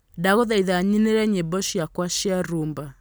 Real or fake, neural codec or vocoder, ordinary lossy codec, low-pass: real; none; none; none